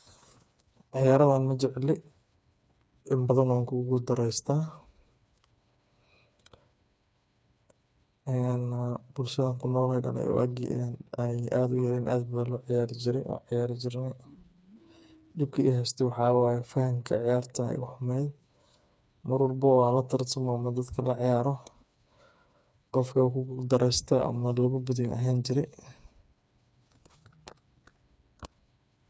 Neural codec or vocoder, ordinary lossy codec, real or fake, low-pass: codec, 16 kHz, 4 kbps, FreqCodec, smaller model; none; fake; none